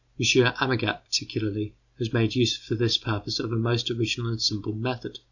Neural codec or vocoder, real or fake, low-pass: none; real; 7.2 kHz